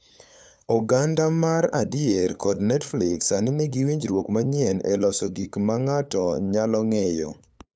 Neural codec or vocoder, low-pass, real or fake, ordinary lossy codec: codec, 16 kHz, 8 kbps, FunCodec, trained on LibriTTS, 25 frames a second; none; fake; none